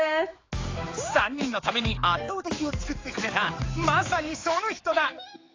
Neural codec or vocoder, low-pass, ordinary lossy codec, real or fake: codec, 16 kHz, 4 kbps, X-Codec, HuBERT features, trained on general audio; 7.2 kHz; AAC, 32 kbps; fake